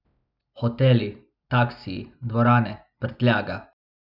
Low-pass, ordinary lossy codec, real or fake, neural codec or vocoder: 5.4 kHz; none; real; none